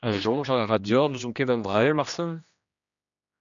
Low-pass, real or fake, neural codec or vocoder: 7.2 kHz; fake; codec, 16 kHz, 1 kbps, X-Codec, HuBERT features, trained on general audio